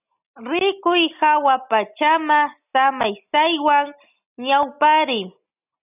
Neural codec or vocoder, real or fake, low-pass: none; real; 3.6 kHz